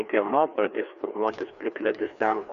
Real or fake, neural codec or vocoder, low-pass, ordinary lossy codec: fake; codec, 16 kHz, 2 kbps, FreqCodec, larger model; 7.2 kHz; Opus, 64 kbps